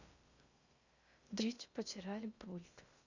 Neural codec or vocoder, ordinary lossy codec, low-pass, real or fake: codec, 16 kHz in and 24 kHz out, 0.6 kbps, FocalCodec, streaming, 2048 codes; Opus, 64 kbps; 7.2 kHz; fake